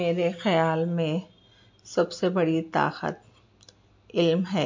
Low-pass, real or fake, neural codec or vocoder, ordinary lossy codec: 7.2 kHz; real; none; MP3, 48 kbps